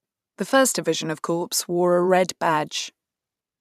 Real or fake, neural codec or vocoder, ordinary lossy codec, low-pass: fake; vocoder, 44.1 kHz, 128 mel bands every 512 samples, BigVGAN v2; none; 14.4 kHz